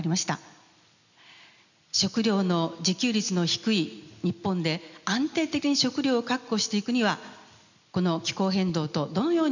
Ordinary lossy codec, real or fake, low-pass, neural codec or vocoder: none; real; 7.2 kHz; none